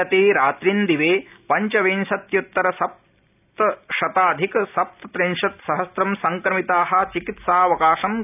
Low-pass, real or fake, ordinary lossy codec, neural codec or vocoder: 3.6 kHz; real; none; none